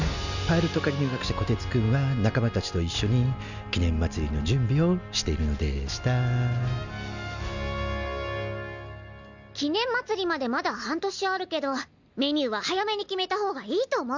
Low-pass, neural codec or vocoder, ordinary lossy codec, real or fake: 7.2 kHz; none; none; real